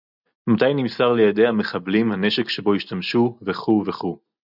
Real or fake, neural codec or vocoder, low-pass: real; none; 5.4 kHz